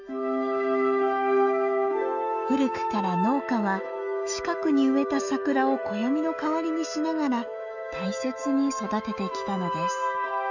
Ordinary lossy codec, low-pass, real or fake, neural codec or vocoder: none; 7.2 kHz; fake; autoencoder, 48 kHz, 128 numbers a frame, DAC-VAE, trained on Japanese speech